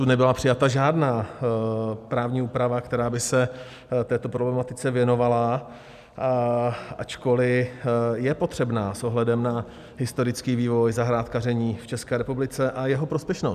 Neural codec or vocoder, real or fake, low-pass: none; real; 14.4 kHz